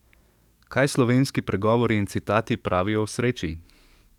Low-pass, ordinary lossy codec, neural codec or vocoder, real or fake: 19.8 kHz; none; codec, 44.1 kHz, 7.8 kbps, DAC; fake